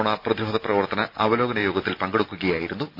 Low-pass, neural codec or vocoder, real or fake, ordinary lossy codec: 5.4 kHz; none; real; none